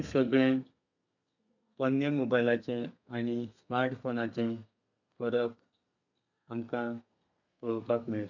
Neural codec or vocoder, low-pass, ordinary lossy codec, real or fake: codec, 32 kHz, 1.9 kbps, SNAC; 7.2 kHz; none; fake